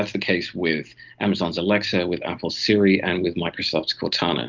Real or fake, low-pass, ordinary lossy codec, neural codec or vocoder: real; 7.2 kHz; Opus, 32 kbps; none